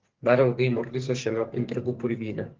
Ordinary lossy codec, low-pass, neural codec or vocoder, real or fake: Opus, 16 kbps; 7.2 kHz; codec, 44.1 kHz, 2.6 kbps, SNAC; fake